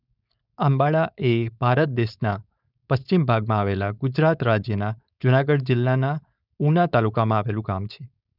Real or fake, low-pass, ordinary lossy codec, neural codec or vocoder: fake; 5.4 kHz; none; codec, 16 kHz, 4.8 kbps, FACodec